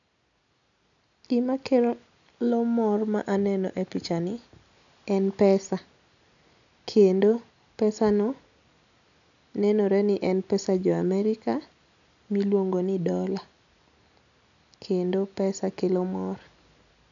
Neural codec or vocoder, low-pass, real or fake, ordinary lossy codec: none; 7.2 kHz; real; none